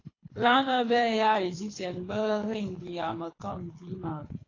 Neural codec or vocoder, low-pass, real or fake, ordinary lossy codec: codec, 24 kHz, 3 kbps, HILCodec; 7.2 kHz; fake; AAC, 32 kbps